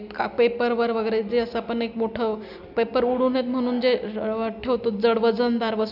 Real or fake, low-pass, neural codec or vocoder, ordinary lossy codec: real; 5.4 kHz; none; none